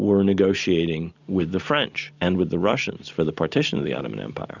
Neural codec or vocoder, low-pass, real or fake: none; 7.2 kHz; real